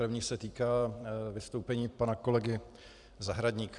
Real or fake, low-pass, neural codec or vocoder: fake; 10.8 kHz; vocoder, 44.1 kHz, 128 mel bands every 256 samples, BigVGAN v2